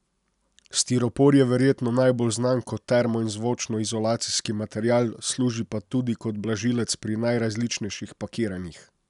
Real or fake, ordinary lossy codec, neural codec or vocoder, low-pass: real; none; none; 10.8 kHz